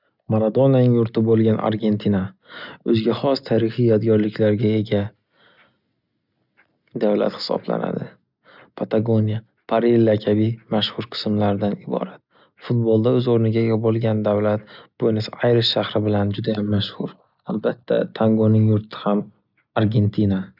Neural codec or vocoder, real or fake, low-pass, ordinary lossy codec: none; real; 5.4 kHz; none